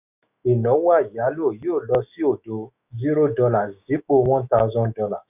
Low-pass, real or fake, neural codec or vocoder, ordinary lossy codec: 3.6 kHz; fake; autoencoder, 48 kHz, 128 numbers a frame, DAC-VAE, trained on Japanese speech; none